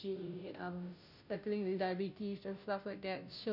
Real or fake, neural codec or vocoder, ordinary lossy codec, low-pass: fake; codec, 16 kHz, 0.5 kbps, FunCodec, trained on Chinese and English, 25 frames a second; none; 5.4 kHz